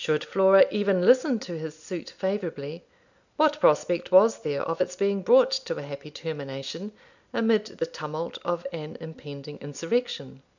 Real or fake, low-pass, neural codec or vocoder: real; 7.2 kHz; none